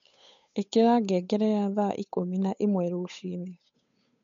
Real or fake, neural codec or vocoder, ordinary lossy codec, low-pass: fake; codec, 16 kHz, 8 kbps, FunCodec, trained on Chinese and English, 25 frames a second; MP3, 48 kbps; 7.2 kHz